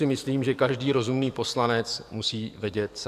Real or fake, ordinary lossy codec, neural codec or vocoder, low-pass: fake; AAC, 96 kbps; codec, 44.1 kHz, 7.8 kbps, DAC; 14.4 kHz